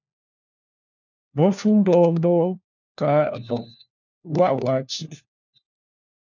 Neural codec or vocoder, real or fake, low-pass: codec, 16 kHz, 1 kbps, FunCodec, trained on LibriTTS, 50 frames a second; fake; 7.2 kHz